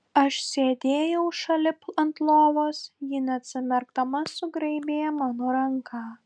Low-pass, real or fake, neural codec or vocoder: 9.9 kHz; real; none